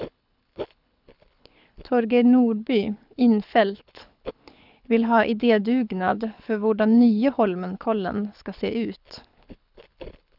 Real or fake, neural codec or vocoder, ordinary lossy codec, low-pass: fake; codec, 24 kHz, 6 kbps, HILCodec; none; 5.4 kHz